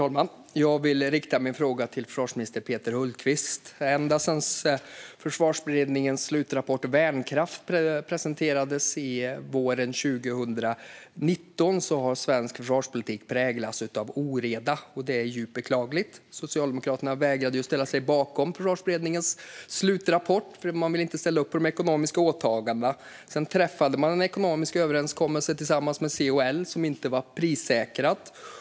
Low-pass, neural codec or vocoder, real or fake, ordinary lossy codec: none; none; real; none